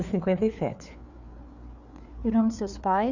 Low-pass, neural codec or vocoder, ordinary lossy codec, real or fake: 7.2 kHz; codec, 16 kHz, 4 kbps, FreqCodec, larger model; none; fake